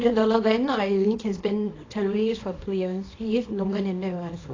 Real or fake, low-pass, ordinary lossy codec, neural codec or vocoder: fake; 7.2 kHz; AAC, 32 kbps; codec, 24 kHz, 0.9 kbps, WavTokenizer, small release